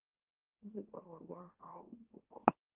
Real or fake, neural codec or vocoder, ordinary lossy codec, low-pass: fake; autoencoder, 44.1 kHz, a latent of 192 numbers a frame, MeloTTS; Opus, 24 kbps; 3.6 kHz